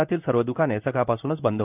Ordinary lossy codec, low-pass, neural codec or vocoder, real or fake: none; 3.6 kHz; codec, 16 kHz in and 24 kHz out, 1 kbps, XY-Tokenizer; fake